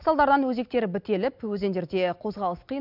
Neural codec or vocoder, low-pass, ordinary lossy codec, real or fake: none; 5.4 kHz; none; real